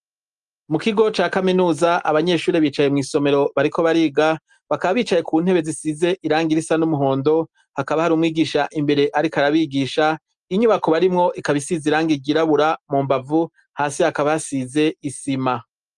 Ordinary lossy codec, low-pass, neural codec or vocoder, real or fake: Opus, 32 kbps; 10.8 kHz; none; real